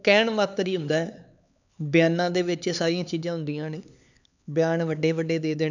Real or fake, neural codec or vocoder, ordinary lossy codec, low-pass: fake; codec, 16 kHz, 4 kbps, X-Codec, HuBERT features, trained on LibriSpeech; none; 7.2 kHz